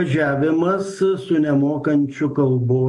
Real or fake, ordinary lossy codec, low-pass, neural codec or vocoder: real; MP3, 48 kbps; 10.8 kHz; none